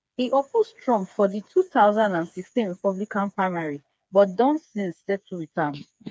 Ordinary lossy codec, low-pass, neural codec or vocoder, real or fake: none; none; codec, 16 kHz, 4 kbps, FreqCodec, smaller model; fake